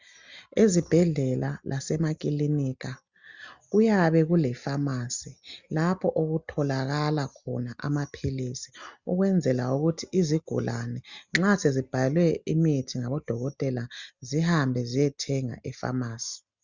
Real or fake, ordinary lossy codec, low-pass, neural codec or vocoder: real; Opus, 64 kbps; 7.2 kHz; none